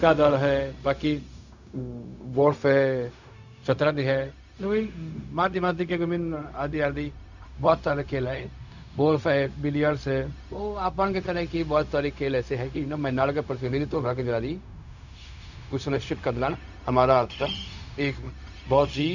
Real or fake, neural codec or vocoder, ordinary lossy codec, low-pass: fake; codec, 16 kHz, 0.4 kbps, LongCat-Audio-Codec; none; 7.2 kHz